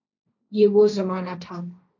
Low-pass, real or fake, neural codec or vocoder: 7.2 kHz; fake; codec, 16 kHz, 1.1 kbps, Voila-Tokenizer